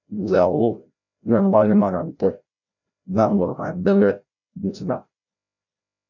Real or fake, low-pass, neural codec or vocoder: fake; 7.2 kHz; codec, 16 kHz, 0.5 kbps, FreqCodec, larger model